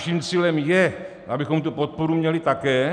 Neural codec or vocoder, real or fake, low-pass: none; real; 9.9 kHz